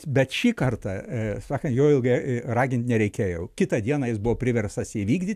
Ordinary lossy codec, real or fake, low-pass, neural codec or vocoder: AAC, 96 kbps; real; 14.4 kHz; none